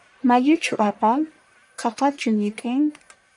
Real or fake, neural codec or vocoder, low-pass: fake; codec, 44.1 kHz, 1.7 kbps, Pupu-Codec; 10.8 kHz